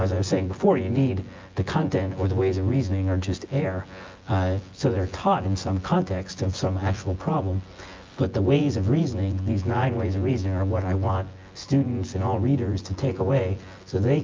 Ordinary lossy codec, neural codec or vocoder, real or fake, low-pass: Opus, 24 kbps; vocoder, 24 kHz, 100 mel bands, Vocos; fake; 7.2 kHz